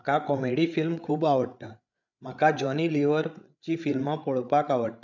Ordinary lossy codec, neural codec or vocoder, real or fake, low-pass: none; codec, 16 kHz, 8 kbps, FreqCodec, larger model; fake; 7.2 kHz